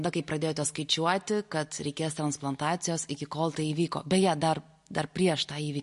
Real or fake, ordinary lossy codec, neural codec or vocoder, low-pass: real; MP3, 48 kbps; none; 14.4 kHz